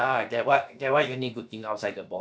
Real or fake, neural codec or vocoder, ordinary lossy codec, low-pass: fake; codec, 16 kHz, about 1 kbps, DyCAST, with the encoder's durations; none; none